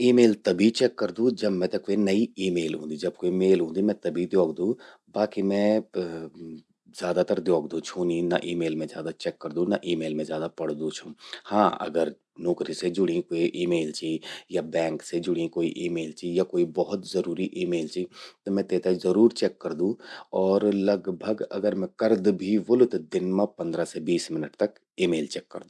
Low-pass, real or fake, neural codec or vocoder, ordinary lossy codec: none; real; none; none